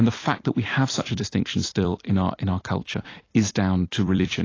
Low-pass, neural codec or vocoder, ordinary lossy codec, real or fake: 7.2 kHz; vocoder, 44.1 kHz, 128 mel bands every 256 samples, BigVGAN v2; AAC, 32 kbps; fake